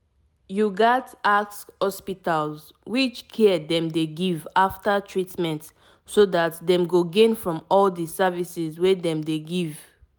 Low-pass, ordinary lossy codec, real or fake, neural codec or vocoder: none; none; real; none